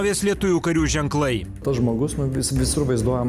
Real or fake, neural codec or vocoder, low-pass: real; none; 14.4 kHz